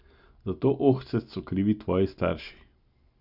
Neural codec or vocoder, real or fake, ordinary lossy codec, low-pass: none; real; Opus, 64 kbps; 5.4 kHz